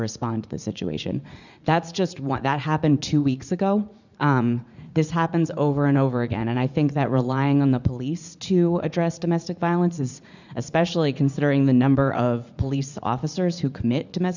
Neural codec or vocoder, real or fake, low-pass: vocoder, 44.1 kHz, 80 mel bands, Vocos; fake; 7.2 kHz